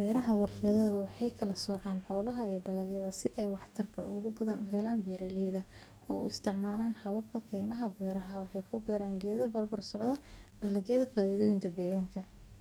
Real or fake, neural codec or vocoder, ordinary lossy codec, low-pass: fake; codec, 44.1 kHz, 2.6 kbps, DAC; none; none